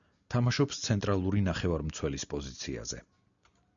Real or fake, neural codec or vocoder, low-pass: real; none; 7.2 kHz